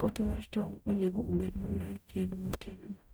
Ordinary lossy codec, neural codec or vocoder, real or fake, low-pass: none; codec, 44.1 kHz, 0.9 kbps, DAC; fake; none